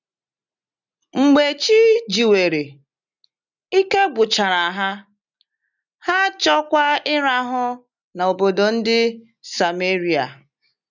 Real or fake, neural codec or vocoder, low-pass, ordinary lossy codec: real; none; 7.2 kHz; none